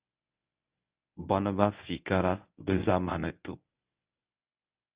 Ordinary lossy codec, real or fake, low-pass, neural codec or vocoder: Opus, 64 kbps; fake; 3.6 kHz; codec, 24 kHz, 0.9 kbps, WavTokenizer, medium speech release version 1